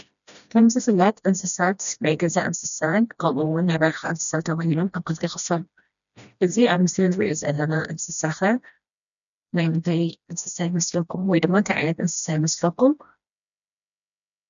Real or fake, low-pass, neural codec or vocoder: fake; 7.2 kHz; codec, 16 kHz, 1 kbps, FreqCodec, smaller model